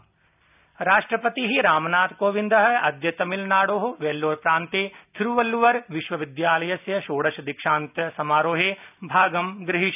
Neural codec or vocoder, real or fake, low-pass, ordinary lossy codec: none; real; 3.6 kHz; none